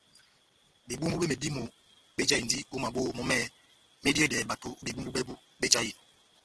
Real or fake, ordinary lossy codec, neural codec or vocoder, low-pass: real; Opus, 16 kbps; none; 10.8 kHz